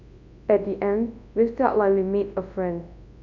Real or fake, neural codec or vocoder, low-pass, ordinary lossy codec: fake; codec, 24 kHz, 0.9 kbps, WavTokenizer, large speech release; 7.2 kHz; MP3, 64 kbps